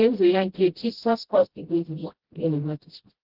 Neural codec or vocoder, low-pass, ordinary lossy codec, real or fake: codec, 16 kHz, 0.5 kbps, FreqCodec, smaller model; 5.4 kHz; Opus, 16 kbps; fake